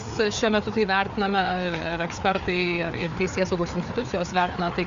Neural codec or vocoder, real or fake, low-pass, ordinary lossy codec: codec, 16 kHz, 4 kbps, FreqCodec, larger model; fake; 7.2 kHz; MP3, 64 kbps